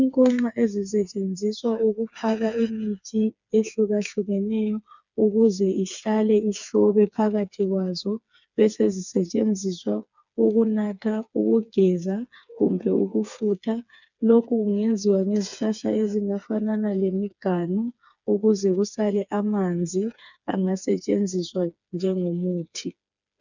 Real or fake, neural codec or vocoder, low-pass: fake; codec, 16 kHz, 4 kbps, FreqCodec, smaller model; 7.2 kHz